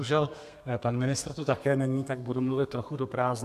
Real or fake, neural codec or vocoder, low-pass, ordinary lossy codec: fake; codec, 32 kHz, 1.9 kbps, SNAC; 14.4 kHz; AAC, 96 kbps